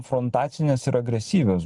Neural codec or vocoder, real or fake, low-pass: none; real; 10.8 kHz